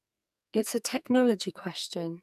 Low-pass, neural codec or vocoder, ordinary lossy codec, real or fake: 14.4 kHz; codec, 44.1 kHz, 2.6 kbps, SNAC; none; fake